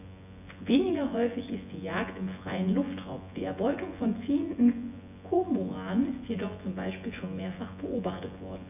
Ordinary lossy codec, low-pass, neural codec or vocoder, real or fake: none; 3.6 kHz; vocoder, 24 kHz, 100 mel bands, Vocos; fake